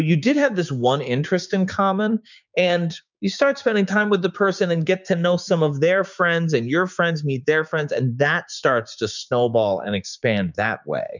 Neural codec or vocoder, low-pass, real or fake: codec, 16 kHz, 6 kbps, DAC; 7.2 kHz; fake